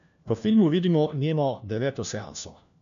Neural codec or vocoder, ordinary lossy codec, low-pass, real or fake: codec, 16 kHz, 1 kbps, FunCodec, trained on LibriTTS, 50 frames a second; none; 7.2 kHz; fake